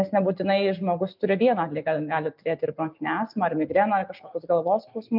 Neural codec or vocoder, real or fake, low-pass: none; real; 5.4 kHz